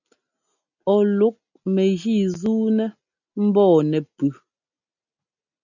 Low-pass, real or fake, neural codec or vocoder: 7.2 kHz; real; none